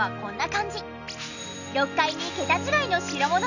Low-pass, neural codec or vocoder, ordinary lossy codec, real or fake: 7.2 kHz; none; none; real